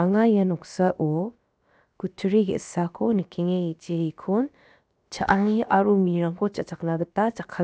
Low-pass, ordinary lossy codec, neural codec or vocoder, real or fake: none; none; codec, 16 kHz, about 1 kbps, DyCAST, with the encoder's durations; fake